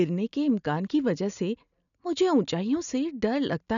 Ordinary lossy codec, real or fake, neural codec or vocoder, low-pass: none; real; none; 7.2 kHz